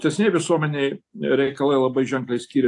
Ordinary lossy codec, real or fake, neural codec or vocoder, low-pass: AAC, 48 kbps; real; none; 10.8 kHz